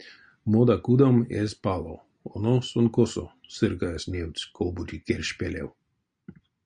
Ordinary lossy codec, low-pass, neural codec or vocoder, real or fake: MP3, 96 kbps; 9.9 kHz; none; real